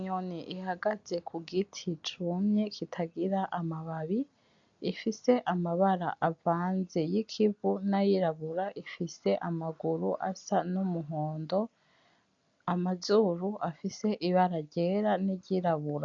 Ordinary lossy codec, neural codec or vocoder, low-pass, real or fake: MP3, 64 kbps; none; 7.2 kHz; real